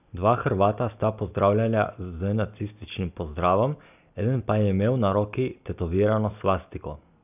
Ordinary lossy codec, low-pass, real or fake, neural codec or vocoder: none; 3.6 kHz; real; none